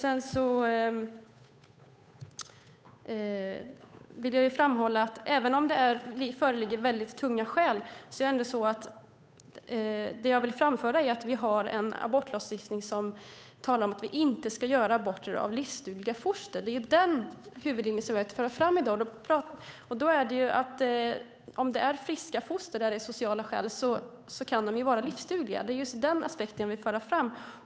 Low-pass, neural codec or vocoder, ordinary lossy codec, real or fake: none; codec, 16 kHz, 8 kbps, FunCodec, trained on Chinese and English, 25 frames a second; none; fake